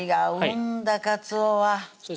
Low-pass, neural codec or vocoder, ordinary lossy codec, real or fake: none; none; none; real